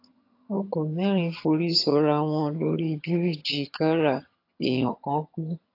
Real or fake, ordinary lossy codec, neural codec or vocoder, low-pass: fake; AAC, 32 kbps; vocoder, 22.05 kHz, 80 mel bands, HiFi-GAN; 5.4 kHz